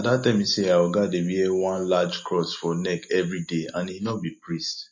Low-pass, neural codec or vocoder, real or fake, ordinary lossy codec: 7.2 kHz; none; real; MP3, 32 kbps